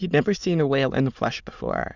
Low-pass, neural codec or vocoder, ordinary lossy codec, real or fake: 7.2 kHz; autoencoder, 22.05 kHz, a latent of 192 numbers a frame, VITS, trained on many speakers; Opus, 64 kbps; fake